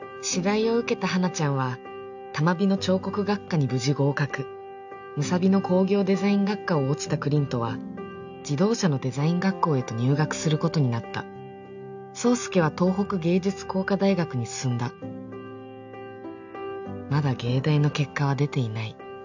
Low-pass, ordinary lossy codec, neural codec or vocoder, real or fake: 7.2 kHz; none; none; real